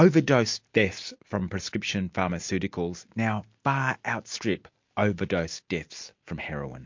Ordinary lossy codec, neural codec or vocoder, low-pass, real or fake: MP3, 48 kbps; none; 7.2 kHz; real